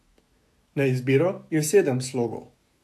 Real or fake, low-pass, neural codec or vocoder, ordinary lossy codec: fake; 14.4 kHz; autoencoder, 48 kHz, 128 numbers a frame, DAC-VAE, trained on Japanese speech; AAC, 64 kbps